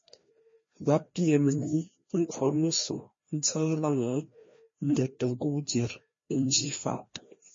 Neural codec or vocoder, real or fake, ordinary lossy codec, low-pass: codec, 16 kHz, 1 kbps, FreqCodec, larger model; fake; MP3, 32 kbps; 7.2 kHz